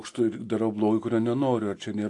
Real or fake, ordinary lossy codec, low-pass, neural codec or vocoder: real; AAC, 48 kbps; 10.8 kHz; none